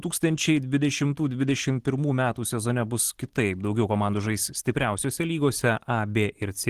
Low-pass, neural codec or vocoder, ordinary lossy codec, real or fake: 14.4 kHz; none; Opus, 16 kbps; real